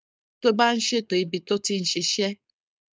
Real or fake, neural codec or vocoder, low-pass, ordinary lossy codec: fake; codec, 16 kHz, 4.8 kbps, FACodec; none; none